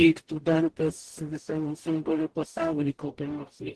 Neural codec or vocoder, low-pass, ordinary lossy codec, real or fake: codec, 44.1 kHz, 0.9 kbps, DAC; 10.8 kHz; Opus, 16 kbps; fake